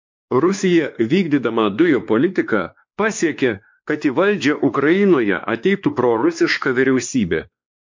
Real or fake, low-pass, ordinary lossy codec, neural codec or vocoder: fake; 7.2 kHz; MP3, 48 kbps; codec, 16 kHz, 2 kbps, X-Codec, WavLM features, trained on Multilingual LibriSpeech